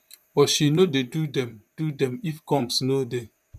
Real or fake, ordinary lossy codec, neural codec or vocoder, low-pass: fake; none; vocoder, 44.1 kHz, 128 mel bands, Pupu-Vocoder; 14.4 kHz